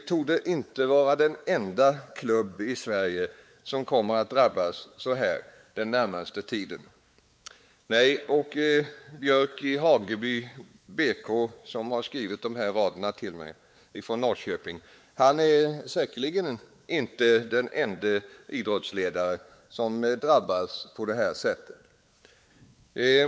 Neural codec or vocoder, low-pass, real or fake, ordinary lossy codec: codec, 16 kHz, 4 kbps, X-Codec, WavLM features, trained on Multilingual LibriSpeech; none; fake; none